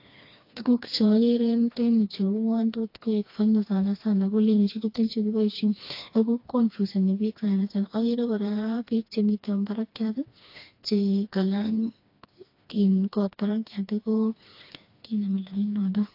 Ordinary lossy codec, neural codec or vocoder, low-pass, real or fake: AAC, 32 kbps; codec, 16 kHz, 2 kbps, FreqCodec, smaller model; 5.4 kHz; fake